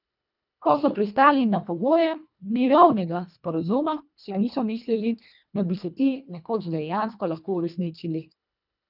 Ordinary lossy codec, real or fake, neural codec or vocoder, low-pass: none; fake; codec, 24 kHz, 1.5 kbps, HILCodec; 5.4 kHz